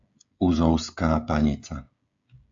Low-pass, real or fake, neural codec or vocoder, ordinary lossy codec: 7.2 kHz; fake; codec, 16 kHz, 16 kbps, FreqCodec, smaller model; AAC, 64 kbps